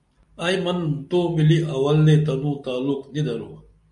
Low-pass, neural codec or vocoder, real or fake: 10.8 kHz; none; real